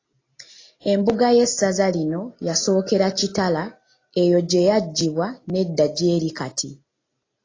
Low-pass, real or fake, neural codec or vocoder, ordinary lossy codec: 7.2 kHz; real; none; AAC, 32 kbps